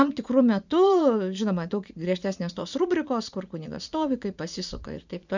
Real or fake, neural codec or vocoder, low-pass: fake; vocoder, 44.1 kHz, 80 mel bands, Vocos; 7.2 kHz